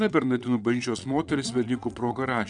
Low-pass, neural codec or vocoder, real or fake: 9.9 kHz; vocoder, 22.05 kHz, 80 mel bands, Vocos; fake